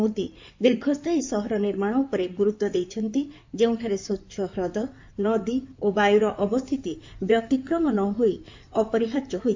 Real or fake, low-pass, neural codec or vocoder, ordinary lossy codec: fake; 7.2 kHz; codec, 16 kHz in and 24 kHz out, 2.2 kbps, FireRedTTS-2 codec; MP3, 48 kbps